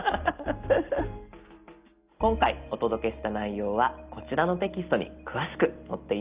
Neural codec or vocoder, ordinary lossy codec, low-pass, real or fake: none; Opus, 32 kbps; 3.6 kHz; real